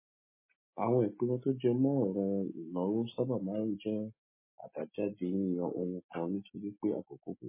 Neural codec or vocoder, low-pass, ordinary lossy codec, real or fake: none; 3.6 kHz; MP3, 16 kbps; real